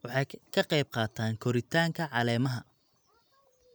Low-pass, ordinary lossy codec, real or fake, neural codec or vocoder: none; none; real; none